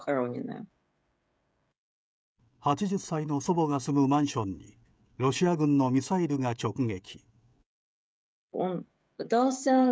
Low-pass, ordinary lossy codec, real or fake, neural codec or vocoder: none; none; fake; codec, 16 kHz, 16 kbps, FreqCodec, smaller model